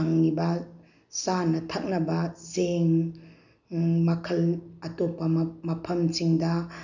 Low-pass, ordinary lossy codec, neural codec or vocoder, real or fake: 7.2 kHz; none; none; real